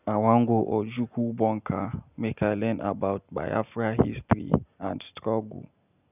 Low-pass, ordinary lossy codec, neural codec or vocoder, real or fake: 3.6 kHz; none; none; real